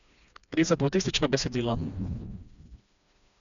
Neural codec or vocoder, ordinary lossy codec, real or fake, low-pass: codec, 16 kHz, 2 kbps, FreqCodec, smaller model; none; fake; 7.2 kHz